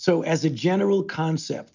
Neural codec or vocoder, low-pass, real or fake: none; 7.2 kHz; real